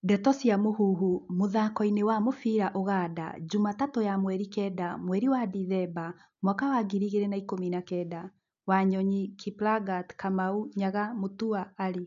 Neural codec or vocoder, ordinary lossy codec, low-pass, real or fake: none; none; 7.2 kHz; real